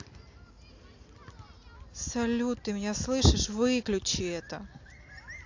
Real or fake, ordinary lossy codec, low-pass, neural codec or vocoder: real; AAC, 48 kbps; 7.2 kHz; none